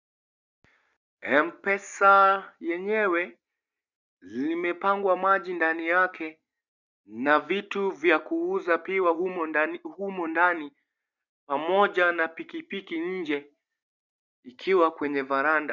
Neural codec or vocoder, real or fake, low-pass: none; real; 7.2 kHz